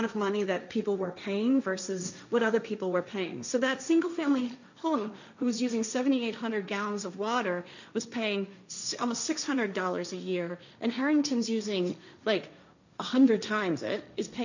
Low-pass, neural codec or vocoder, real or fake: 7.2 kHz; codec, 16 kHz, 1.1 kbps, Voila-Tokenizer; fake